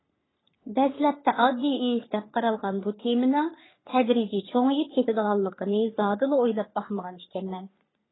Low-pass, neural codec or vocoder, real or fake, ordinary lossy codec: 7.2 kHz; codec, 44.1 kHz, 3.4 kbps, Pupu-Codec; fake; AAC, 16 kbps